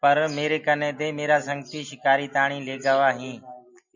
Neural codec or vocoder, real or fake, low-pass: none; real; 7.2 kHz